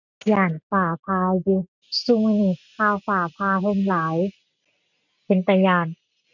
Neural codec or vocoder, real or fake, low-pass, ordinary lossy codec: none; real; 7.2 kHz; none